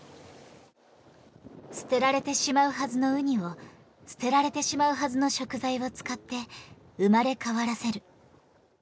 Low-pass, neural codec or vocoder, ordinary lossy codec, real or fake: none; none; none; real